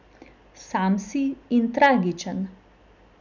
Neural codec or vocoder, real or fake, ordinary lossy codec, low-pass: none; real; none; 7.2 kHz